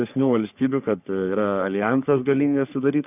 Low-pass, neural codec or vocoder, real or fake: 3.6 kHz; codec, 44.1 kHz, 3.4 kbps, Pupu-Codec; fake